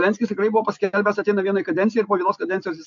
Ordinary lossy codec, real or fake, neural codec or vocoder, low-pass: AAC, 64 kbps; real; none; 7.2 kHz